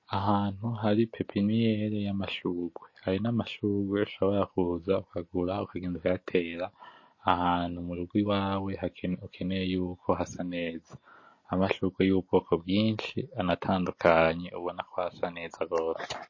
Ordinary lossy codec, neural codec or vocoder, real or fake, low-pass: MP3, 32 kbps; none; real; 7.2 kHz